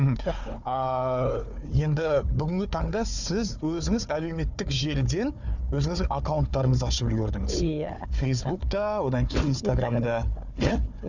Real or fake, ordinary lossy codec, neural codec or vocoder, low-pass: fake; none; codec, 16 kHz, 4 kbps, FunCodec, trained on Chinese and English, 50 frames a second; 7.2 kHz